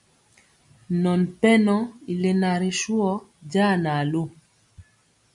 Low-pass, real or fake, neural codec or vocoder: 10.8 kHz; real; none